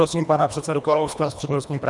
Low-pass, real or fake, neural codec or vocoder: 10.8 kHz; fake; codec, 24 kHz, 1.5 kbps, HILCodec